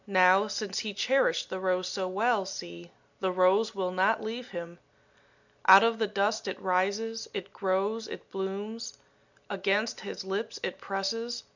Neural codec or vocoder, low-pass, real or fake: none; 7.2 kHz; real